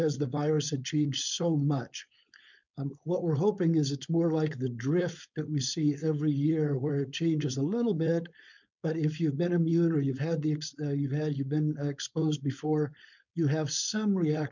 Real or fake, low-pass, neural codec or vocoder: fake; 7.2 kHz; codec, 16 kHz, 4.8 kbps, FACodec